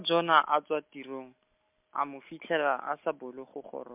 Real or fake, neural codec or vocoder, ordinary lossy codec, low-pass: real; none; MP3, 32 kbps; 3.6 kHz